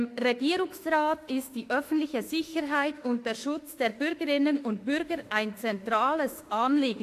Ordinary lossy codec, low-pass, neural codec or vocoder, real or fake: AAC, 64 kbps; 14.4 kHz; autoencoder, 48 kHz, 32 numbers a frame, DAC-VAE, trained on Japanese speech; fake